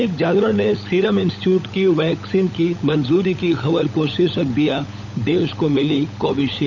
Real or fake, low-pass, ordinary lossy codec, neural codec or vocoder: fake; 7.2 kHz; none; codec, 16 kHz, 16 kbps, FunCodec, trained on LibriTTS, 50 frames a second